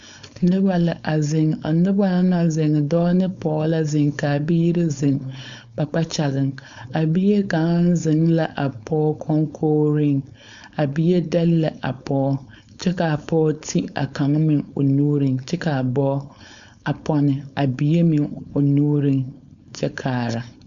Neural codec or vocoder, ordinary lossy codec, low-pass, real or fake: codec, 16 kHz, 4.8 kbps, FACodec; MP3, 96 kbps; 7.2 kHz; fake